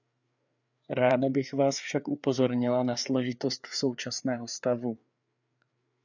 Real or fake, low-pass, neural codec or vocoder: fake; 7.2 kHz; codec, 16 kHz, 4 kbps, FreqCodec, larger model